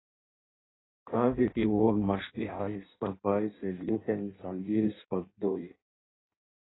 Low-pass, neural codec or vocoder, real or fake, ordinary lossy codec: 7.2 kHz; codec, 16 kHz in and 24 kHz out, 0.6 kbps, FireRedTTS-2 codec; fake; AAC, 16 kbps